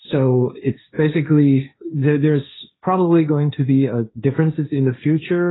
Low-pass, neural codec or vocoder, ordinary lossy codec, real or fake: 7.2 kHz; codec, 16 kHz, 1.1 kbps, Voila-Tokenizer; AAC, 16 kbps; fake